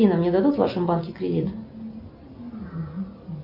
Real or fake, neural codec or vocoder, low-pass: real; none; 5.4 kHz